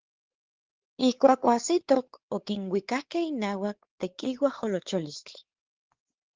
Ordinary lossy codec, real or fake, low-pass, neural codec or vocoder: Opus, 16 kbps; fake; 7.2 kHz; codec, 16 kHz, 4 kbps, X-Codec, WavLM features, trained on Multilingual LibriSpeech